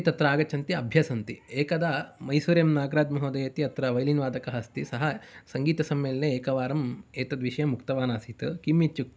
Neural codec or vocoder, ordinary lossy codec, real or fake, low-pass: none; none; real; none